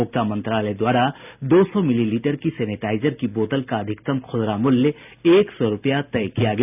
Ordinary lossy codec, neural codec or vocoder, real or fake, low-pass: none; none; real; 3.6 kHz